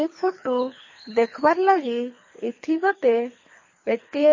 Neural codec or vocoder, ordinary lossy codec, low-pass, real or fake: codec, 24 kHz, 3 kbps, HILCodec; MP3, 32 kbps; 7.2 kHz; fake